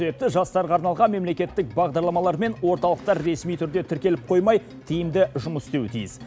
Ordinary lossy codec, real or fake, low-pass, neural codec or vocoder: none; real; none; none